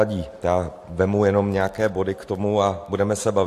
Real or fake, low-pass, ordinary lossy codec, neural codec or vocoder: real; 14.4 kHz; AAC, 64 kbps; none